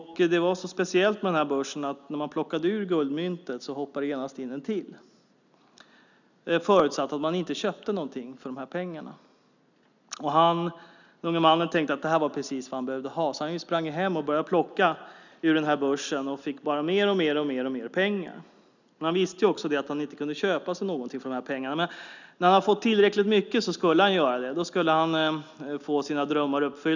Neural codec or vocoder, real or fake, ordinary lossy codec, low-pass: none; real; none; 7.2 kHz